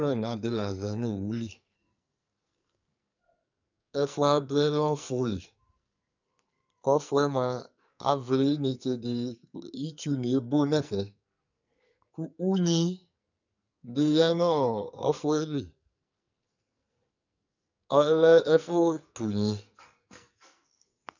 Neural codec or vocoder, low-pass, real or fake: codec, 44.1 kHz, 2.6 kbps, SNAC; 7.2 kHz; fake